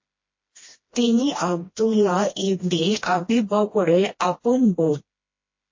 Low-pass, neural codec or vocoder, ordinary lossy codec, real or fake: 7.2 kHz; codec, 16 kHz, 1 kbps, FreqCodec, smaller model; MP3, 32 kbps; fake